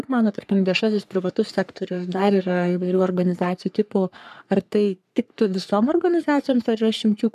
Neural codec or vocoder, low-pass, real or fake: codec, 44.1 kHz, 3.4 kbps, Pupu-Codec; 14.4 kHz; fake